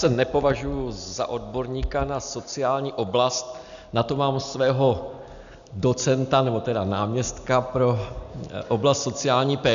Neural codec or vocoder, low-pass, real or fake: none; 7.2 kHz; real